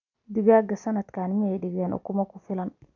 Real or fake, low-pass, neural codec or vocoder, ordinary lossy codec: real; 7.2 kHz; none; none